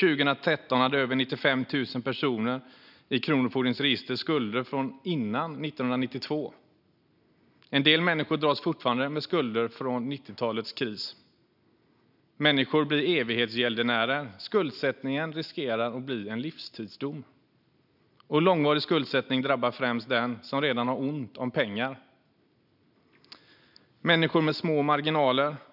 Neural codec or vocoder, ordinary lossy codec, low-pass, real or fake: none; none; 5.4 kHz; real